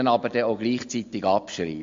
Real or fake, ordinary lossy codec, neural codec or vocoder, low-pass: real; MP3, 48 kbps; none; 7.2 kHz